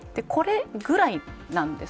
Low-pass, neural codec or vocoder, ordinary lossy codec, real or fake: none; none; none; real